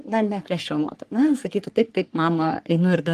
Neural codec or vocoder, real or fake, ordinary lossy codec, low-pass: codec, 44.1 kHz, 3.4 kbps, Pupu-Codec; fake; Opus, 24 kbps; 14.4 kHz